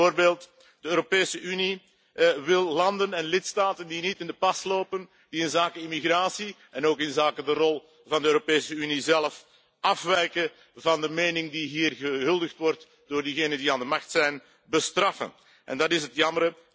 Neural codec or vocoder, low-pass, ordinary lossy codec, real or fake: none; none; none; real